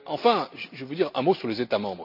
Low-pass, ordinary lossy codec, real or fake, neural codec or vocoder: 5.4 kHz; MP3, 48 kbps; real; none